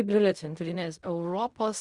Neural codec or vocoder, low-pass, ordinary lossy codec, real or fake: codec, 16 kHz in and 24 kHz out, 0.4 kbps, LongCat-Audio-Codec, fine tuned four codebook decoder; 10.8 kHz; Opus, 64 kbps; fake